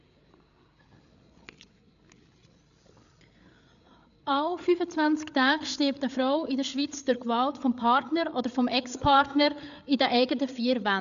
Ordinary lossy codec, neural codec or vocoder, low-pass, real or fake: none; codec, 16 kHz, 8 kbps, FreqCodec, larger model; 7.2 kHz; fake